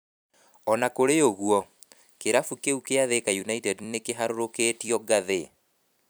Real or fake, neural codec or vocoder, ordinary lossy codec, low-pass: fake; vocoder, 44.1 kHz, 128 mel bands every 256 samples, BigVGAN v2; none; none